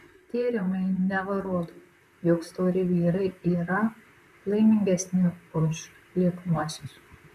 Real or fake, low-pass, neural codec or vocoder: fake; 14.4 kHz; vocoder, 44.1 kHz, 128 mel bands, Pupu-Vocoder